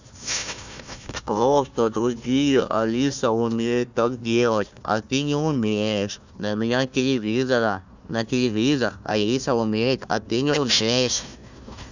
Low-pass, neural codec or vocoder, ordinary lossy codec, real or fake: 7.2 kHz; codec, 16 kHz, 1 kbps, FunCodec, trained on Chinese and English, 50 frames a second; none; fake